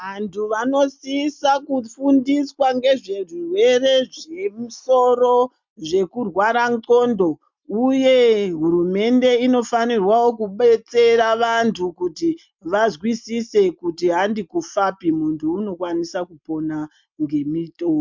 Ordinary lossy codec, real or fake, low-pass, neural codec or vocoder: MP3, 64 kbps; real; 7.2 kHz; none